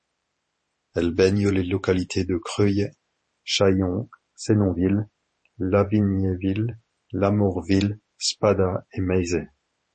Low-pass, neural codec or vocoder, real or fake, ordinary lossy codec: 10.8 kHz; vocoder, 44.1 kHz, 128 mel bands every 512 samples, BigVGAN v2; fake; MP3, 32 kbps